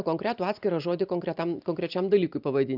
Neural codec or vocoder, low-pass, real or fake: none; 5.4 kHz; real